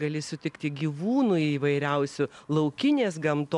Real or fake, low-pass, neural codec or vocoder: real; 10.8 kHz; none